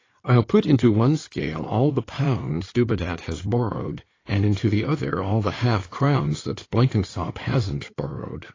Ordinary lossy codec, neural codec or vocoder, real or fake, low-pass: AAC, 32 kbps; codec, 16 kHz in and 24 kHz out, 2.2 kbps, FireRedTTS-2 codec; fake; 7.2 kHz